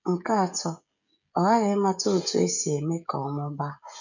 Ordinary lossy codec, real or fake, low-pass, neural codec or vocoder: none; fake; 7.2 kHz; codec, 16 kHz, 16 kbps, FreqCodec, smaller model